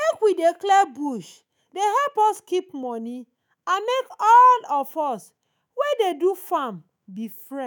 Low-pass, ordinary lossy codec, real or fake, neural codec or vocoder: none; none; fake; autoencoder, 48 kHz, 128 numbers a frame, DAC-VAE, trained on Japanese speech